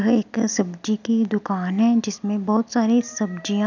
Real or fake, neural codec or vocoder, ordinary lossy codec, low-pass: real; none; none; 7.2 kHz